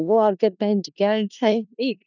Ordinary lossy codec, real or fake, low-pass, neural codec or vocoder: none; fake; 7.2 kHz; codec, 16 kHz in and 24 kHz out, 0.4 kbps, LongCat-Audio-Codec, four codebook decoder